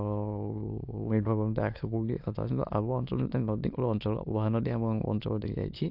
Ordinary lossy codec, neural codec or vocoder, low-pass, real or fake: AAC, 48 kbps; autoencoder, 22.05 kHz, a latent of 192 numbers a frame, VITS, trained on many speakers; 5.4 kHz; fake